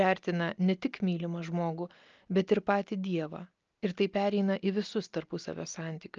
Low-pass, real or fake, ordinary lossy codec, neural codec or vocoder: 7.2 kHz; real; Opus, 24 kbps; none